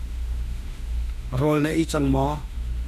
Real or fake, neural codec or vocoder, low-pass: fake; autoencoder, 48 kHz, 32 numbers a frame, DAC-VAE, trained on Japanese speech; 14.4 kHz